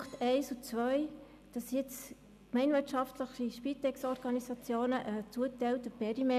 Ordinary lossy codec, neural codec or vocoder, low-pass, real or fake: none; none; 14.4 kHz; real